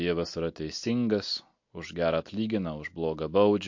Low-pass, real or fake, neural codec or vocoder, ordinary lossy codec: 7.2 kHz; real; none; MP3, 48 kbps